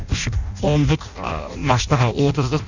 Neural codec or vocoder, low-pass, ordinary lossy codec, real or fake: codec, 16 kHz in and 24 kHz out, 0.6 kbps, FireRedTTS-2 codec; 7.2 kHz; none; fake